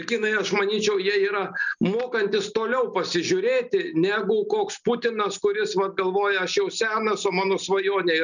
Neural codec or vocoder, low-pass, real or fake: none; 7.2 kHz; real